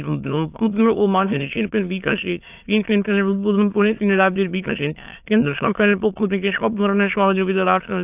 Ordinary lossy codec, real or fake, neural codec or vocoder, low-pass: AAC, 32 kbps; fake; autoencoder, 22.05 kHz, a latent of 192 numbers a frame, VITS, trained on many speakers; 3.6 kHz